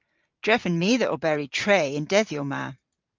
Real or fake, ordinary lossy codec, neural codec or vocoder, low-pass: fake; Opus, 32 kbps; vocoder, 44.1 kHz, 128 mel bands every 512 samples, BigVGAN v2; 7.2 kHz